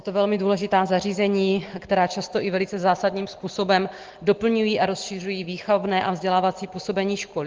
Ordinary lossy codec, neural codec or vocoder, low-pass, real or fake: Opus, 16 kbps; none; 7.2 kHz; real